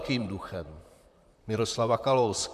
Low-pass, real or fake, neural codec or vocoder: 14.4 kHz; fake; vocoder, 44.1 kHz, 128 mel bands, Pupu-Vocoder